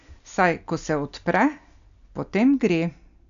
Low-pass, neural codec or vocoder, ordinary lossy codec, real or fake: 7.2 kHz; none; none; real